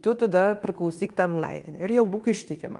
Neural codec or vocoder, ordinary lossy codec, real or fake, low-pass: codec, 16 kHz in and 24 kHz out, 0.9 kbps, LongCat-Audio-Codec, fine tuned four codebook decoder; Opus, 32 kbps; fake; 10.8 kHz